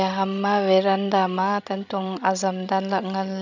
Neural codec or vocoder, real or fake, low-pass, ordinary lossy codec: codec, 16 kHz, 16 kbps, FreqCodec, larger model; fake; 7.2 kHz; none